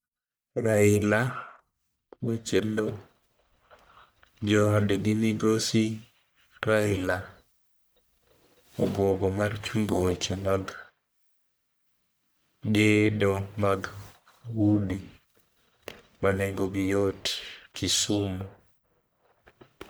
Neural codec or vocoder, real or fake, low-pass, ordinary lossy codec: codec, 44.1 kHz, 1.7 kbps, Pupu-Codec; fake; none; none